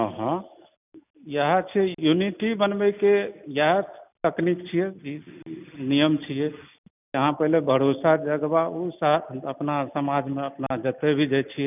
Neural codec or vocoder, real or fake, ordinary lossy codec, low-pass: none; real; none; 3.6 kHz